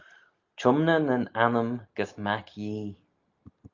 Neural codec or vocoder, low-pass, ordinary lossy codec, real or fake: none; 7.2 kHz; Opus, 24 kbps; real